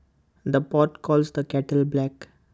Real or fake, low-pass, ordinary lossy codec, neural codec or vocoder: real; none; none; none